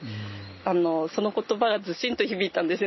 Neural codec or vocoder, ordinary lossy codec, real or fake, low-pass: none; MP3, 24 kbps; real; 7.2 kHz